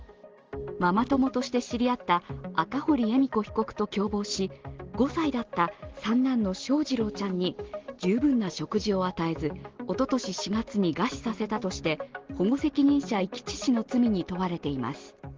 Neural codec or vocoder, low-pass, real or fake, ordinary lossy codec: none; 7.2 kHz; real; Opus, 16 kbps